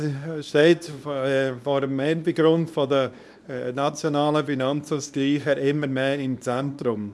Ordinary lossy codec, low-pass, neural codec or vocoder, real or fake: none; none; codec, 24 kHz, 0.9 kbps, WavTokenizer, small release; fake